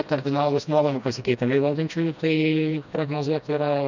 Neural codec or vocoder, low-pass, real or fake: codec, 16 kHz, 1 kbps, FreqCodec, smaller model; 7.2 kHz; fake